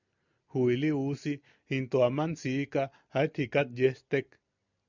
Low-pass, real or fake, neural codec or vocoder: 7.2 kHz; real; none